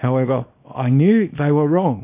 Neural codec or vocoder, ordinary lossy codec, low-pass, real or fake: codec, 24 kHz, 0.9 kbps, WavTokenizer, small release; MP3, 32 kbps; 3.6 kHz; fake